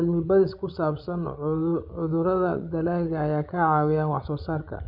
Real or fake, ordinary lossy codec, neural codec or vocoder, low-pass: fake; none; codec, 16 kHz, 16 kbps, FreqCodec, larger model; 5.4 kHz